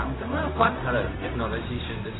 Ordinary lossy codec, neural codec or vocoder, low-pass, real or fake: AAC, 16 kbps; codec, 16 kHz, 0.4 kbps, LongCat-Audio-Codec; 7.2 kHz; fake